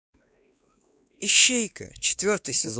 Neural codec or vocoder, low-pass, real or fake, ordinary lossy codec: codec, 16 kHz, 2 kbps, X-Codec, WavLM features, trained on Multilingual LibriSpeech; none; fake; none